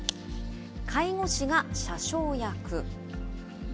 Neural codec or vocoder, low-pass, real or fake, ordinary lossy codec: none; none; real; none